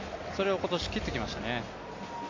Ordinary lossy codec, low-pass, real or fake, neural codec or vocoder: MP3, 48 kbps; 7.2 kHz; real; none